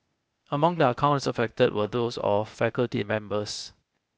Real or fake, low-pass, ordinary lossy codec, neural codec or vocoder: fake; none; none; codec, 16 kHz, 0.8 kbps, ZipCodec